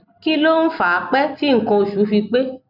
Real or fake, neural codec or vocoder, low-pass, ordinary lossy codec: real; none; 5.4 kHz; none